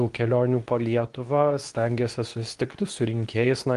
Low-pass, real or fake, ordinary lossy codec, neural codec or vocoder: 10.8 kHz; fake; Opus, 24 kbps; codec, 24 kHz, 0.9 kbps, WavTokenizer, medium speech release version 2